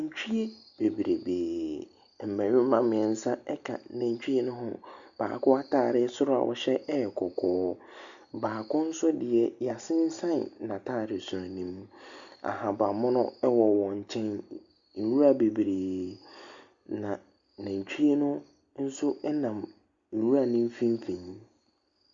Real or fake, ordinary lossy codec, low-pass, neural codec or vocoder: real; Opus, 64 kbps; 7.2 kHz; none